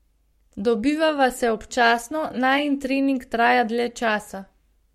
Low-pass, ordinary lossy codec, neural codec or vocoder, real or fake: 19.8 kHz; MP3, 64 kbps; codec, 44.1 kHz, 7.8 kbps, Pupu-Codec; fake